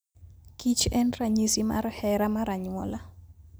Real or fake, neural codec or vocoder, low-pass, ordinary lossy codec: real; none; none; none